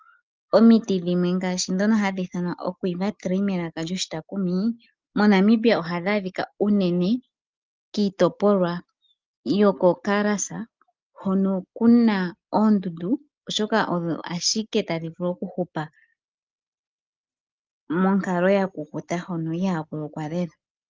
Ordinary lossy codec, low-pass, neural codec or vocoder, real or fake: Opus, 32 kbps; 7.2 kHz; none; real